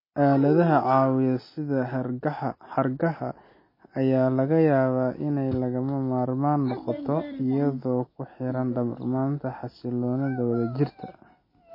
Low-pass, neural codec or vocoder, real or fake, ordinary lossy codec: 5.4 kHz; none; real; MP3, 24 kbps